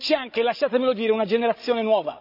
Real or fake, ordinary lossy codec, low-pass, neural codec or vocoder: fake; none; 5.4 kHz; codec, 16 kHz, 16 kbps, FreqCodec, larger model